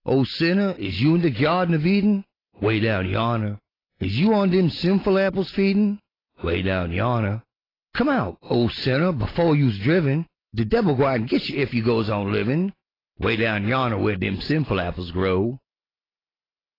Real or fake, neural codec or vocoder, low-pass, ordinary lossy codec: real; none; 5.4 kHz; AAC, 24 kbps